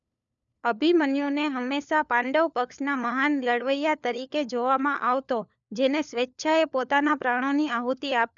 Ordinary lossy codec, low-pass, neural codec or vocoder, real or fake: none; 7.2 kHz; codec, 16 kHz, 4 kbps, FunCodec, trained on LibriTTS, 50 frames a second; fake